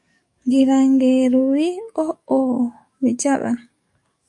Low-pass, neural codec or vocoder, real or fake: 10.8 kHz; codec, 44.1 kHz, 7.8 kbps, DAC; fake